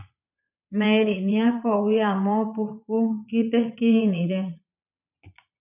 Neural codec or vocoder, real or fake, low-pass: vocoder, 22.05 kHz, 80 mel bands, Vocos; fake; 3.6 kHz